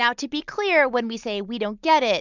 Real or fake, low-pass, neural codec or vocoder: real; 7.2 kHz; none